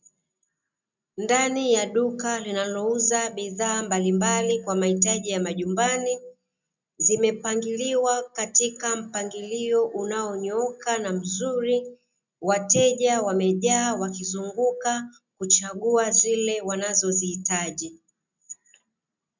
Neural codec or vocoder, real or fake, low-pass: none; real; 7.2 kHz